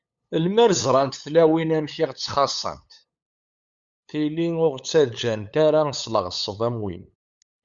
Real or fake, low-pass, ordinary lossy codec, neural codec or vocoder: fake; 7.2 kHz; Opus, 64 kbps; codec, 16 kHz, 8 kbps, FunCodec, trained on LibriTTS, 25 frames a second